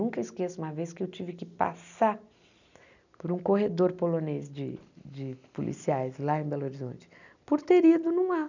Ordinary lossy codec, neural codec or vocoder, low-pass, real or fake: none; none; 7.2 kHz; real